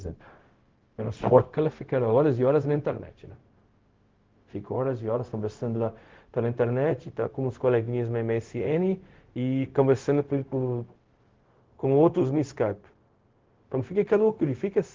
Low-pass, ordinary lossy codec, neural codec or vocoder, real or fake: 7.2 kHz; Opus, 16 kbps; codec, 16 kHz, 0.4 kbps, LongCat-Audio-Codec; fake